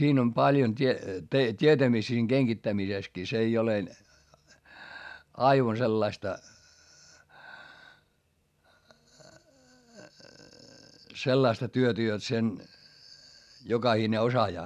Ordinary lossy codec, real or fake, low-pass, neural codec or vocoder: none; real; 14.4 kHz; none